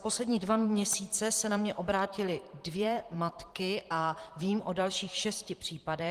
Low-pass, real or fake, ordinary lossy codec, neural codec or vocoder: 14.4 kHz; fake; Opus, 24 kbps; vocoder, 44.1 kHz, 128 mel bands, Pupu-Vocoder